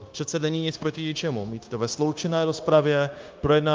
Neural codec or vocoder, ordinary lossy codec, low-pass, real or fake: codec, 16 kHz, 0.9 kbps, LongCat-Audio-Codec; Opus, 24 kbps; 7.2 kHz; fake